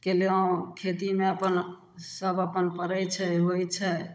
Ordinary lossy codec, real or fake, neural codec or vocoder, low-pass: none; fake; codec, 16 kHz, 16 kbps, FunCodec, trained on Chinese and English, 50 frames a second; none